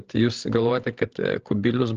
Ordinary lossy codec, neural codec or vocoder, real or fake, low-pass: Opus, 16 kbps; codec, 16 kHz, 16 kbps, FreqCodec, larger model; fake; 7.2 kHz